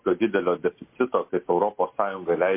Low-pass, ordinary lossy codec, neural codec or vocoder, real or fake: 3.6 kHz; MP3, 24 kbps; none; real